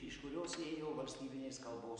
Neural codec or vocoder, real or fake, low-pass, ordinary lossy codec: none; real; 9.9 kHz; MP3, 64 kbps